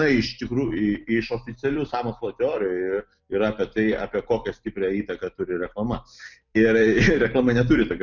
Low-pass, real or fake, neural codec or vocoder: 7.2 kHz; real; none